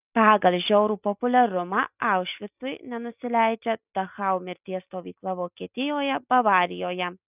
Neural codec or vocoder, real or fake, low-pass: none; real; 3.6 kHz